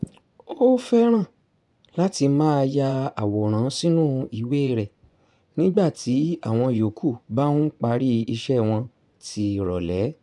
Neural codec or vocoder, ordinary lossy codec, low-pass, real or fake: vocoder, 24 kHz, 100 mel bands, Vocos; none; 10.8 kHz; fake